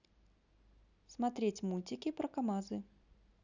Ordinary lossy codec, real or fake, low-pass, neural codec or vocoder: none; real; 7.2 kHz; none